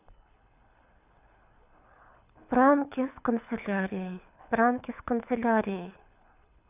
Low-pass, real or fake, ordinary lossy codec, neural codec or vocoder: 3.6 kHz; fake; none; codec, 24 kHz, 6 kbps, HILCodec